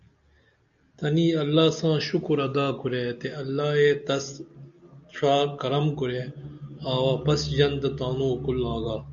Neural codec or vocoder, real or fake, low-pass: none; real; 7.2 kHz